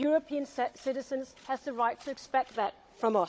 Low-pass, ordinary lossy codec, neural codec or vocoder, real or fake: none; none; codec, 16 kHz, 16 kbps, FunCodec, trained on Chinese and English, 50 frames a second; fake